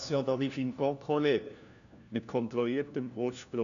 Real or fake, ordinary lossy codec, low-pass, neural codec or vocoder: fake; none; 7.2 kHz; codec, 16 kHz, 1 kbps, FunCodec, trained on LibriTTS, 50 frames a second